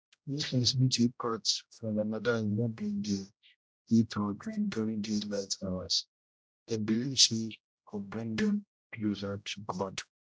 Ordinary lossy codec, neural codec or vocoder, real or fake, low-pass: none; codec, 16 kHz, 0.5 kbps, X-Codec, HuBERT features, trained on general audio; fake; none